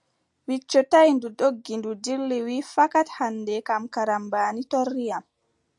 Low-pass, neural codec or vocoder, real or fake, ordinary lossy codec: 10.8 kHz; none; real; AAC, 64 kbps